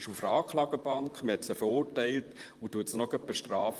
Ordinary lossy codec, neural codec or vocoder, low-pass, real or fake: Opus, 32 kbps; vocoder, 44.1 kHz, 128 mel bands, Pupu-Vocoder; 14.4 kHz; fake